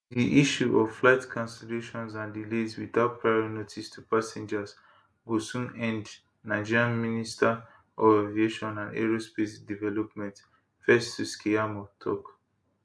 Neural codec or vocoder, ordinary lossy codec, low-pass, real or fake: none; none; none; real